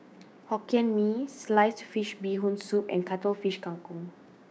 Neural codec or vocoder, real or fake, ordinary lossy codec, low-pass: codec, 16 kHz, 6 kbps, DAC; fake; none; none